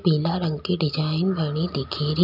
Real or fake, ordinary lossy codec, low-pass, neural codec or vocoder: real; none; 5.4 kHz; none